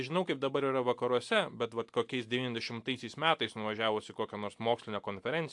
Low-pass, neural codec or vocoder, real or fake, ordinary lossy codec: 10.8 kHz; none; real; AAC, 64 kbps